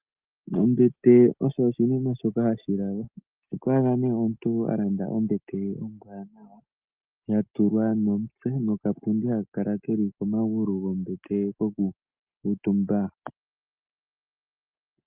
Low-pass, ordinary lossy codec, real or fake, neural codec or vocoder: 3.6 kHz; Opus, 24 kbps; real; none